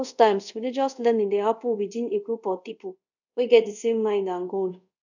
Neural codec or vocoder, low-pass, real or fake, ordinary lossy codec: codec, 24 kHz, 0.5 kbps, DualCodec; 7.2 kHz; fake; none